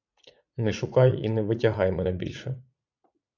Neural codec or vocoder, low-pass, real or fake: vocoder, 44.1 kHz, 80 mel bands, Vocos; 7.2 kHz; fake